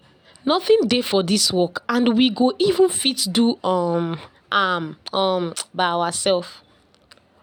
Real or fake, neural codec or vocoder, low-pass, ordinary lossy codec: real; none; none; none